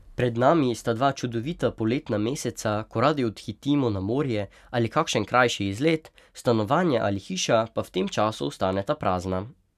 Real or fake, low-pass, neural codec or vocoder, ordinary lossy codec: real; 14.4 kHz; none; none